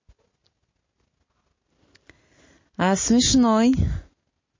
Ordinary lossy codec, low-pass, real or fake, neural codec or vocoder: MP3, 32 kbps; 7.2 kHz; real; none